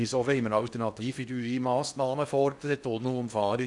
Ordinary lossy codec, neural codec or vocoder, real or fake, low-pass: none; codec, 16 kHz in and 24 kHz out, 0.8 kbps, FocalCodec, streaming, 65536 codes; fake; 10.8 kHz